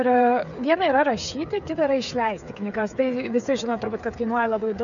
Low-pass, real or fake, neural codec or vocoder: 7.2 kHz; fake; codec, 16 kHz, 8 kbps, FreqCodec, smaller model